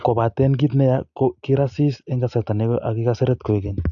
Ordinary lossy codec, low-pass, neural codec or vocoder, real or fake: AAC, 64 kbps; 7.2 kHz; none; real